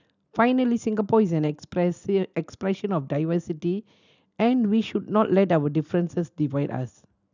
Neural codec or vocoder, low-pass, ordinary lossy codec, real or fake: none; 7.2 kHz; none; real